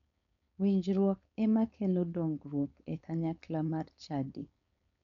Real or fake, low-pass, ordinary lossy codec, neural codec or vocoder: fake; 7.2 kHz; MP3, 96 kbps; codec, 16 kHz, 4.8 kbps, FACodec